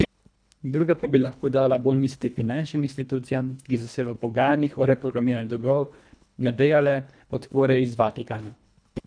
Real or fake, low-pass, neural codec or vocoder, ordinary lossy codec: fake; 9.9 kHz; codec, 24 kHz, 1.5 kbps, HILCodec; none